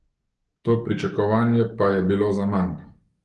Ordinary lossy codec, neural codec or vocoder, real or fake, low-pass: Opus, 24 kbps; codec, 44.1 kHz, 7.8 kbps, DAC; fake; 10.8 kHz